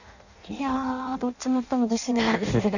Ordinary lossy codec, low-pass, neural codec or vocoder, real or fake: none; 7.2 kHz; codec, 16 kHz in and 24 kHz out, 0.6 kbps, FireRedTTS-2 codec; fake